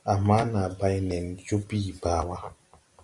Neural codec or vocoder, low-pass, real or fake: none; 10.8 kHz; real